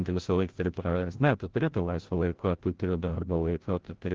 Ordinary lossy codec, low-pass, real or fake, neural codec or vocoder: Opus, 16 kbps; 7.2 kHz; fake; codec, 16 kHz, 0.5 kbps, FreqCodec, larger model